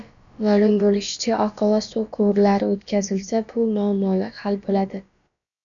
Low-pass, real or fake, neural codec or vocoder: 7.2 kHz; fake; codec, 16 kHz, about 1 kbps, DyCAST, with the encoder's durations